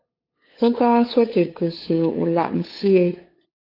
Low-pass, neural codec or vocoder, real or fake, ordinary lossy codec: 5.4 kHz; codec, 16 kHz, 8 kbps, FunCodec, trained on LibriTTS, 25 frames a second; fake; AAC, 32 kbps